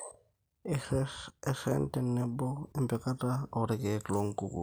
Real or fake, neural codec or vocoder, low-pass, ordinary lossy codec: real; none; none; none